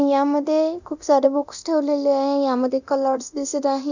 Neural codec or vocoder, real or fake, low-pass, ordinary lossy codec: codec, 24 kHz, 0.9 kbps, DualCodec; fake; 7.2 kHz; none